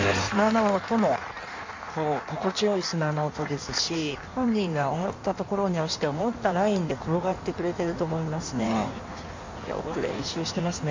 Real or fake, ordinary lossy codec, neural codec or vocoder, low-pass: fake; MP3, 64 kbps; codec, 16 kHz in and 24 kHz out, 1.1 kbps, FireRedTTS-2 codec; 7.2 kHz